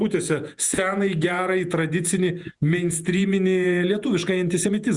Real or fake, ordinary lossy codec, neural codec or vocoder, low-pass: fake; Opus, 64 kbps; vocoder, 48 kHz, 128 mel bands, Vocos; 10.8 kHz